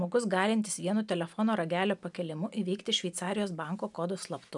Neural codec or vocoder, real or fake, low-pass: none; real; 10.8 kHz